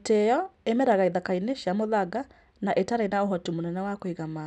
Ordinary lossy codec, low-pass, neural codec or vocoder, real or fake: none; none; none; real